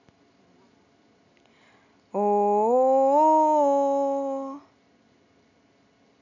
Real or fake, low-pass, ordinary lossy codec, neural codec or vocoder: real; 7.2 kHz; none; none